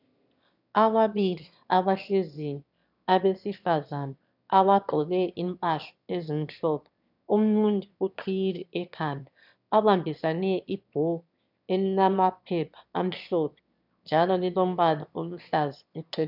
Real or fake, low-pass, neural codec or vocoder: fake; 5.4 kHz; autoencoder, 22.05 kHz, a latent of 192 numbers a frame, VITS, trained on one speaker